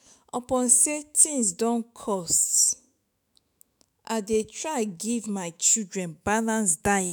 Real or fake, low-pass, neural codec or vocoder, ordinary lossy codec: fake; none; autoencoder, 48 kHz, 128 numbers a frame, DAC-VAE, trained on Japanese speech; none